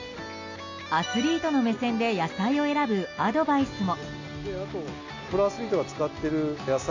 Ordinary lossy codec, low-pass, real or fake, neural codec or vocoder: AAC, 48 kbps; 7.2 kHz; real; none